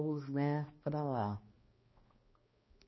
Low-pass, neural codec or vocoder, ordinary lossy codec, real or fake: 7.2 kHz; codec, 16 kHz, 1 kbps, X-Codec, HuBERT features, trained on balanced general audio; MP3, 24 kbps; fake